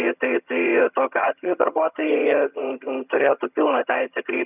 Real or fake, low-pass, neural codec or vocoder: fake; 3.6 kHz; vocoder, 22.05 kHz, 80 mel bands, HiFi-GAN